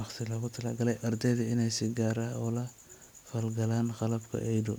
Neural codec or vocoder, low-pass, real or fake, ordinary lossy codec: none; none; real; none